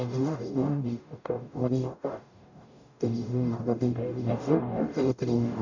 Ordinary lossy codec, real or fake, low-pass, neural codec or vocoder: none; fake; 7.2 kHz; codec, 44.1 kHz, 0.9 kbps, DAC